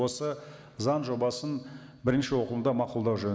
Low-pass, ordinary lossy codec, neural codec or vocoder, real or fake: none; none; none; real